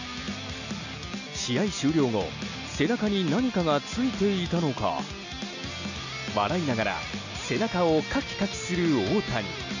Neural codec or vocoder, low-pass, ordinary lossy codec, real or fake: none; 7.2 kHz; none; real